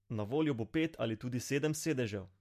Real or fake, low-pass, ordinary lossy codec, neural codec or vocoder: real; 14.4 kHz; MP3, 64 kbps; none